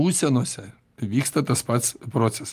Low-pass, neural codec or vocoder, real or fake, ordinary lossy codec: 14.4 kHz; none; real; Opus, 32 kbps